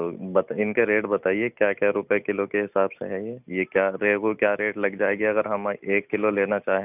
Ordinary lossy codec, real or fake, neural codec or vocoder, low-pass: none; real; none; 3.6 kHz